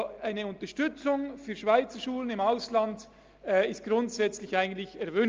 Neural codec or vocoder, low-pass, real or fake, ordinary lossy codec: none; 7.2 kHz; real; Opus, 24 kbps